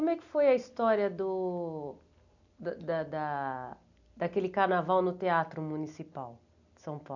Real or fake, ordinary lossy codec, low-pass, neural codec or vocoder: real; none; 7.2 kHz; none